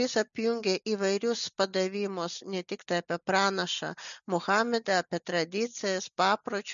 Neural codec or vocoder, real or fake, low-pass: none; real; 7.2 kHz